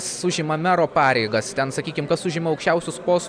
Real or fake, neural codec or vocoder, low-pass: real; none; 9.9 kHz